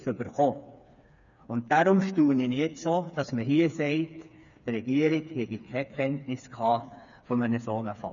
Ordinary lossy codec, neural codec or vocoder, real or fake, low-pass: none; codec, 16 kHz, 4 kbps, FreqCodec, smaller model; fake; 7.2 kHz